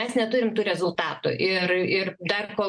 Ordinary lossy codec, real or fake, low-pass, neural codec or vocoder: MP3, 64 kbps; real; 9.9 kHz; none